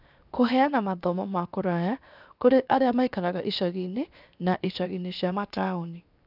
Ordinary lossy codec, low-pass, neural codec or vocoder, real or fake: none; 5.4 kHz; codec, 16 kHz, 0.7 kbps, FocalCodec; fake